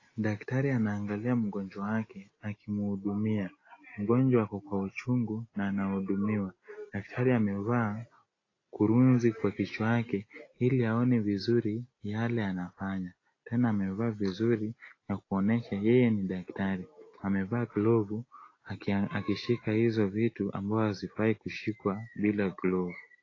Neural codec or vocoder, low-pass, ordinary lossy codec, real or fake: none; 7.2 kHz; AAC, 32 kbps; real